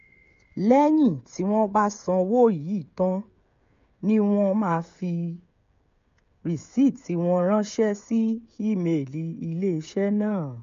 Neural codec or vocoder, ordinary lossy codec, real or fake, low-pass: codec, 16 kHz, 16 kbps, FreqCodec, smaller model; MP3, 48 kbps; fake; 7.2 kHz